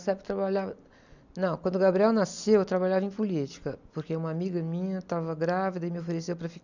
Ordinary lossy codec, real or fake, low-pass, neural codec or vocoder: none; real; 7.2 kHz; none